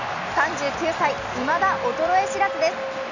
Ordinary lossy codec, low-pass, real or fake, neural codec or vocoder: none; 7.2 kHz; real; none